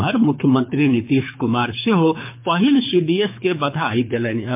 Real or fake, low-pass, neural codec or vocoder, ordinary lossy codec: fake; 3.6 kHz; codec, 24 kHz, 6 kbps, HILCodec; MP3, 32 kbps